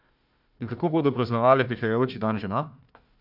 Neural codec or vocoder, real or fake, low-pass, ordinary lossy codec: codec, 16 kHz, 1 kbps, FunCodec, trained on Chinese and English, 50 frames a second; fake; 5.4 kHz; none